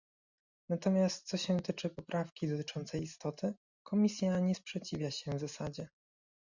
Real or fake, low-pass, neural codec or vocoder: real; 7.2 kHz; none